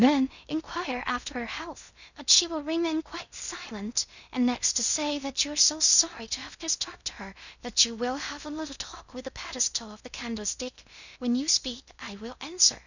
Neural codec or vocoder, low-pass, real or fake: codec, 16 kHz in and 24 kHz out, 0.6 kbps, FocalCodec, streaming, 2048 codes; 7.2 kHz; fake